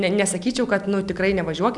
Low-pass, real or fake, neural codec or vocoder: 10.8 kHz; real; none